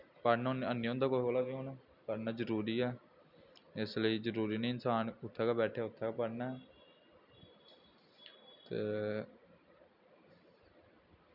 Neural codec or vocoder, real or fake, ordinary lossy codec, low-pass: none; real; none; 5.4 kHz